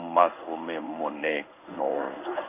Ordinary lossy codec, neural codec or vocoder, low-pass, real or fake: none; codec, 16 kHz in and 24 kHz out, 1 kbps, XY-Tokenizer; 3.6 kHz; fake